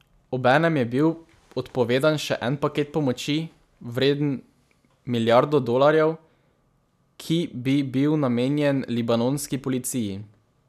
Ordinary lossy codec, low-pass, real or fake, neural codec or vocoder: none; 14.4 kHz; real; none